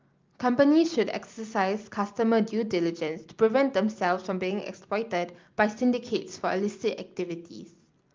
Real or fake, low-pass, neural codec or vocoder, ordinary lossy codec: real; 7.2 kHz; none; Opus, 24 kbps